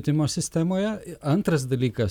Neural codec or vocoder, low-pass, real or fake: none; 19.8 kHz; real